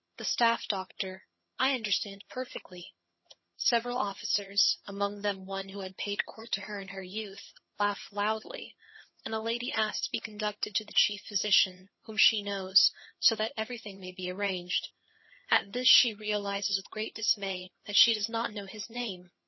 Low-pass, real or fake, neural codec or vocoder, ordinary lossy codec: 7.2 kHz; fake; vocoder, 22.05 kHz, 80 mel bands, HiFi-GAN; MP3, 24 kbps